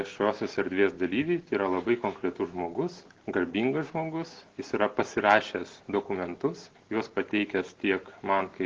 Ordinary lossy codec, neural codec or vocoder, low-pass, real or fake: Opus, 16 kbps; none; 7.2 kHz; real